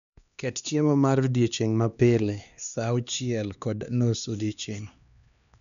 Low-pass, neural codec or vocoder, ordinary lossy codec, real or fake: 7.2 kHz; codec, 16 kHz, 2 kbps, X-Codec, HuBERT features, trained on LibriSpeech; none; fake